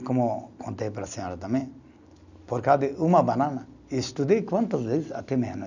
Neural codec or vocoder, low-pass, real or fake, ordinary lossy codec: none; 7.2 kHz; real; none